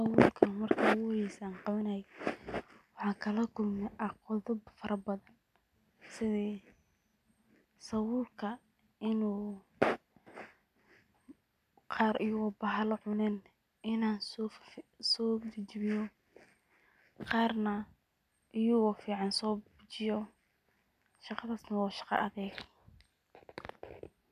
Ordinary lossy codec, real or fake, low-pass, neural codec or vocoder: none; real; 14.4 kHz; none